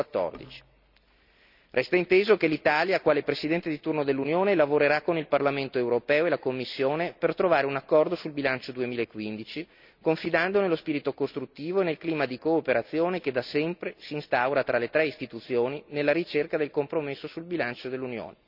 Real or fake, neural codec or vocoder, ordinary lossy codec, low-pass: real; none; none; 5.4 kHz